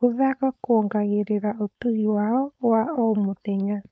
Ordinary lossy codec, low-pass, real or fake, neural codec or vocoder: none; none; fake; codec, 16 kHz, 4.8 kbps, FACodec